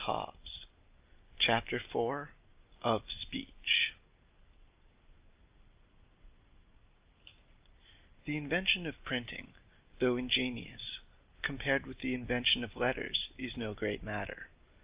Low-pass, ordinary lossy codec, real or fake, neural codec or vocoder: 3.6 kHz; Opus, 24 kbps; real; none